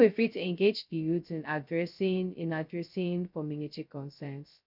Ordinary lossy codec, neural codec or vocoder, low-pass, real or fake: none; codec, 16 kHz, 0.2 kbps, FocalCodec; 5.4 kHz; fake